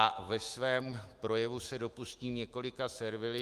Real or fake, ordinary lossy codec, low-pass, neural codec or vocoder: fake; Opus, 32 kbps; 14.4 kHz; autoencoder, 48 kHz, 128 numbers a frame, DAC-VAE, trained on Japanese speech